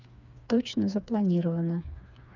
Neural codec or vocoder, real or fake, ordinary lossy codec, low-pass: codec, 16 kHz, 4 kbps, FreqCodec, smaller model; fake; none; 7.2 kHz